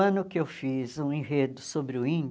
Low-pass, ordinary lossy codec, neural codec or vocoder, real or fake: none; none; none; real